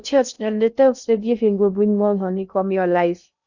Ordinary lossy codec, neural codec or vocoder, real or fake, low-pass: none; codec, 16 kHz in and 24 kHz out, 0.6 kbps, FocalCodec, streaming, 2048 codes; fake; 7.2 kHz